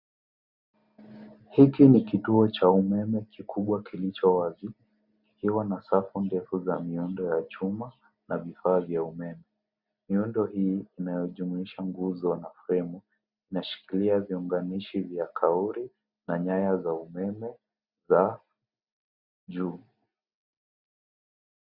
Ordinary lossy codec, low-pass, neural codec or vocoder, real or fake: Opus, 64 kbps; 5.4 kHz; none; real